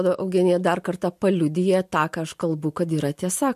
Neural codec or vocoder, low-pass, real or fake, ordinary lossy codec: none; 14.4 kHz; real; MP3, 64 kbps